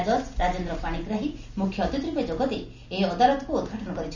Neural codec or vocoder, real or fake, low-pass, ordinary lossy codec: none; real; 7.2 kHz; none